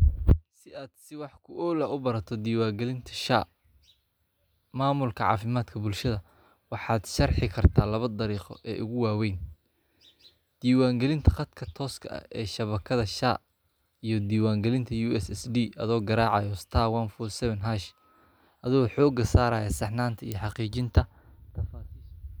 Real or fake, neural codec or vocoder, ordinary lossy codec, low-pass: real; none; none; none